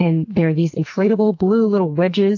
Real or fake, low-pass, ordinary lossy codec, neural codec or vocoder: fake; 7.2 kHz; AAC, 48 kbps; codec, 44.1 kHz, 2.6 kbps, SNAC